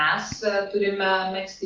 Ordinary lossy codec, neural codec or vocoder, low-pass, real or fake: Opus, 32 kbps; none; 7.2 kHz; real